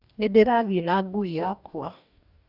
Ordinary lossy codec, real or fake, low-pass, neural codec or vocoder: none; fake; 5.4 kHz; codec, 44.1 kHz, 2.6 kbps, DAC